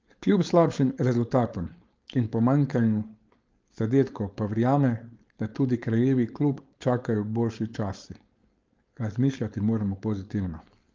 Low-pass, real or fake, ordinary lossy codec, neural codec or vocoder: 7.2 kHz; fake; Opus, 32 kbps; codec, 16 kHz, 4.8 kbps, FACodec